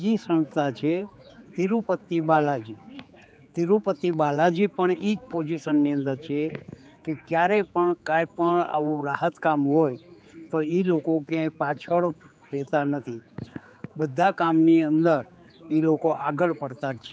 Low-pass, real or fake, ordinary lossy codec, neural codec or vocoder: none; fake; none; codec, 16 kHz, 4 kbps, X-Codec, HuBERT features, trained on general audio